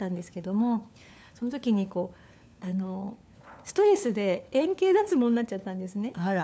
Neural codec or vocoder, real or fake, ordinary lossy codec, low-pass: codec, 16 kHz, 4 kbps, FunCodec, trained on LibriTTS, 50 frames a second; fake; none; none